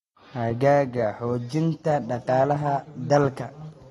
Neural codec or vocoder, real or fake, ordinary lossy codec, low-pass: none; real; AAC, 32 kbps; 19.8 kHz